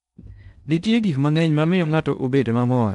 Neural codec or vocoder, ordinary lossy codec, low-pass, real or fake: codec, 16 kHz in and 24 kHz out, 0.6 kbps, FocalCodec, streaming, 4096 codes; none; 10.8 kHz; fake